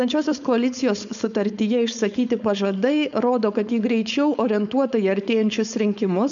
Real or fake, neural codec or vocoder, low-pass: fake; codec, 16 kHz, 4.8 kbps, FACodec; 7.2 kHz